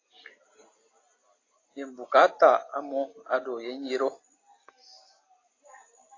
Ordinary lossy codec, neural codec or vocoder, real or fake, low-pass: AAC, 32 kbps; none; real; 7.2 kHz